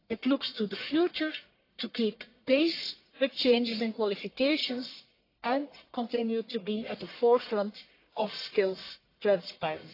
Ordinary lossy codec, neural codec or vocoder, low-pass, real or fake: AAC, 32 kbps; codec, 44.1 kHz, 1.7 kbps, Pupu-Codec; 5.4 kHz; fake